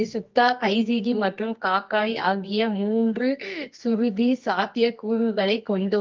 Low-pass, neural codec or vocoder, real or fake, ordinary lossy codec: 7.2 kHz; codec, 24 kHz, 0.9 kbps, WavTokenizer, medium music audio release; fake; Opus, 32 kbps